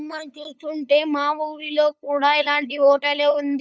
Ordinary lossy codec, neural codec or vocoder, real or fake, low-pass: none; codec, 16 kHz, 8 kbps, FunCodec, trained on LibriTTS, 25 frames a second; fake; none